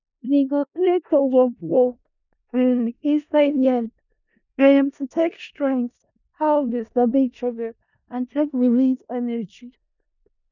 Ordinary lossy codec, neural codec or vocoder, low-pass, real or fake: none; codec, 16 kHz in and 24 kHz out, 0.4 kbps, LongCat-Audio-Codec, four codebook decoder; 7.2 kHz; fake